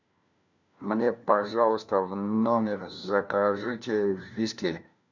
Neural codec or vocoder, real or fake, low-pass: codec, 16 kHz, 1 kbps, FunCodec, trained on LibriTTS, 50 frames a second; fake; 7.2 kHz